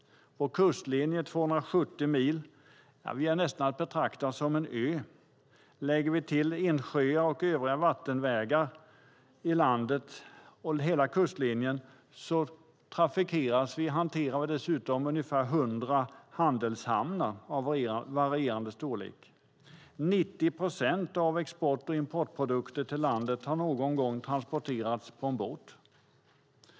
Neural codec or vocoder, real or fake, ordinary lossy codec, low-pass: none; real; none; none